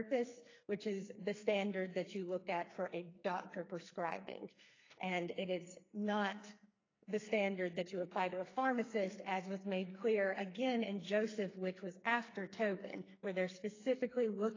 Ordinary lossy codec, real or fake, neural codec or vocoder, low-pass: AAC, 32 kbps; fake; codec, 44.1 kHz, 2.6 kbps, SNAC; 7.2 kHz